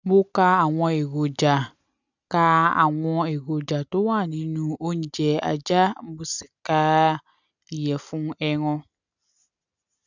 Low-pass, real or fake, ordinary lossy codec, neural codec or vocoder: 7.2 kHz; real; none; none